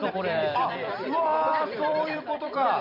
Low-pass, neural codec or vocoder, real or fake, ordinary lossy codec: 5.4 kHz; vocoder, 44.1 kHz, 128 mel bands every 256 samples, BigVGAN v2; fake; none